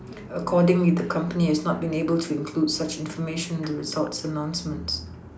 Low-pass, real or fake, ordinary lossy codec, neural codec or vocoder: none; real; none; none